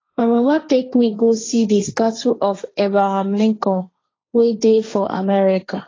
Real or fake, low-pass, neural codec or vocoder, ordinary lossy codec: fake; 7.2 kHz; codec, 16 kHz, 1.1 kbps, Voila-Tokenizer; AAC, 32 kbps